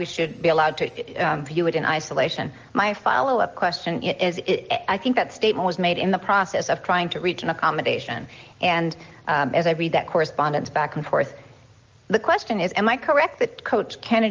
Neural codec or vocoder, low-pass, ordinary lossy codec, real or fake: none; 7.2 kHz; Opus, 24 kbps; real